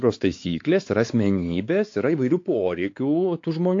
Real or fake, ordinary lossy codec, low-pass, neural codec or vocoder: fake; AAC, 48 kbps; 7.2 kHz; codec, 16 kHz, 4 kbps, X-Codec, WavLM features, trained on Multilingual LibriSpeech